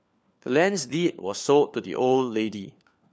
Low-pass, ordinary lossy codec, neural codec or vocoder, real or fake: none; none; codec, 16 kHz, 4 kbps, FunCodec, trained on LibriTTS, 50 frames a second; fake